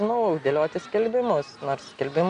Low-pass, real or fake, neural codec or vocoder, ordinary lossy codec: 9.9 kHz; real; none; AAC, 96 kbps